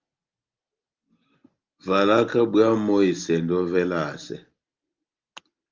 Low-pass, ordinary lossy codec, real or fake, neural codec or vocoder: 7.2 kHz; Opus, 32 kbps; real; none